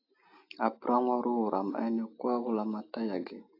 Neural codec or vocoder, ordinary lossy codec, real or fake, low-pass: none; AAC, 32 kbps; real; 5.4 kHz